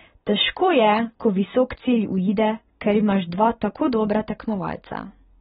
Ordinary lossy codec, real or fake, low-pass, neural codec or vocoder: AAC, 16 kbps; real; 19.8 kHz; none